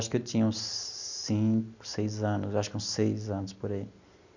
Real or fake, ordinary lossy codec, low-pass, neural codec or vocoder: real; none; 7.2 kHz; none